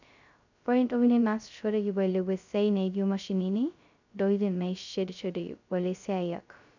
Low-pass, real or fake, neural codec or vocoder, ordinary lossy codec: 7.2 kHz; fake; codec, 16 kHz, 0.2 kbps, FocalCodec; none